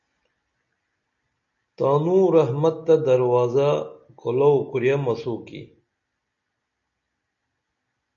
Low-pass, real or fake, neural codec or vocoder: 7.2 kHz; real; none